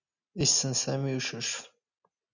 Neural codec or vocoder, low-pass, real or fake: none; 7.2 kHz; real